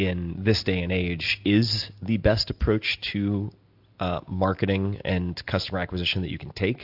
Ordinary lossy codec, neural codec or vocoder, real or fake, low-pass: AAC, 48 kbps; none; real; 5.4 kHz